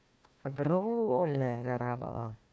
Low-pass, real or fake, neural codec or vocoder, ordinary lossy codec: none; fake; codec, 16 kHz, 1 kbps, FunCodec, trained on Chinese and English, 50 frames a second; none